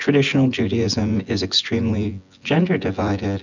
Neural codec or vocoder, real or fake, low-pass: vocoder, 24 kHz, 100 mel bands, Vocos; fake; 7.2 kHz